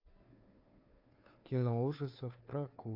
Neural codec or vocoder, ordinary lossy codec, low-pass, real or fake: codec, 16 kHz, 2 kbps, FunCodec, trained on Chinese and English, 25 frames a second; AAC, 32 kbps; 5.4 kHz; fake